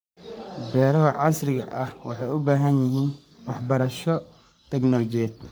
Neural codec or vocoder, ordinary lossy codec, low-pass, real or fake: codec, 44.1 kHz, 3.4 kbps, Pupu-Codec; none; none; fake